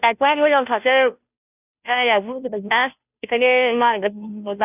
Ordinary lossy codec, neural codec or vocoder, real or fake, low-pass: none; codec, 16 kHz, 0.5 kbps, FunCodec, trained on Chinese and English, 25 frames a second; fake; 3.6 kHz